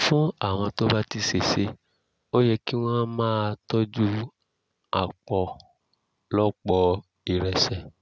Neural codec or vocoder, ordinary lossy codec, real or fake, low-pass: none; none; real; none